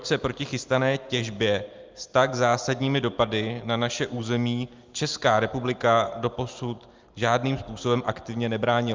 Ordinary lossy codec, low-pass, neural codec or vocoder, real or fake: Opus, 24 kbps; 7.2 kHz; none; real